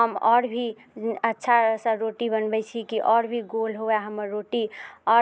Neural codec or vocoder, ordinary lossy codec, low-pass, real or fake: none; none; none; real